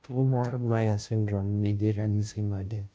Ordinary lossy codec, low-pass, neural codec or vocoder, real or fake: none; none; codec, 16 kHz, 0.5 kbps, FunCodec, trained on Chinese and English, 25 frames a second; fake